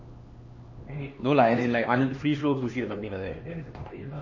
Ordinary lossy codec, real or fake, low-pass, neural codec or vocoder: MP3, 32 kbps; fake; 7.2 kHz; codec, 16 kHz, 2 kbps, X-Codec, HuBERT features, trained on LibriSpeech